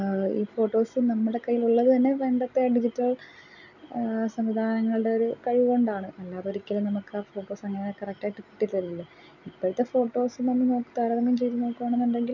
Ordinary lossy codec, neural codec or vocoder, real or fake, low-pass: none; none; real; 7.2 kHz